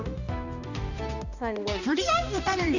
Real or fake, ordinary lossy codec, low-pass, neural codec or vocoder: fake; none; 7.2 kHz; codec, 16 kHz, 1 kbps, X-Codec, HuBERT features, trained on balanced general audio